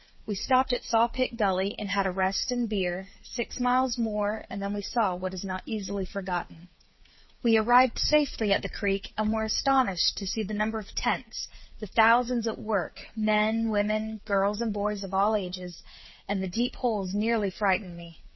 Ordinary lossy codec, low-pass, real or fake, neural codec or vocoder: MP3, 24 kbps; 7.2 kHz; fake; codec, 16 kHz, 8 kbps, FreqCodec, smaller model